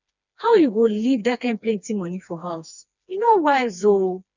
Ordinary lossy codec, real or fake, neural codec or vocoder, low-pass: none; fake; codec, 16 kHz, 2 kbps, FreqCodec, smaller model; 7.2 kHz